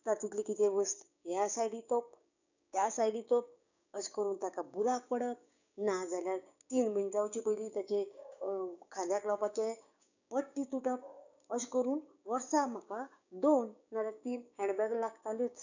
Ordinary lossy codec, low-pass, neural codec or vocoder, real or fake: none; 7.2 kHz; codec, 16 kHz, 6 kbps, DAC; fake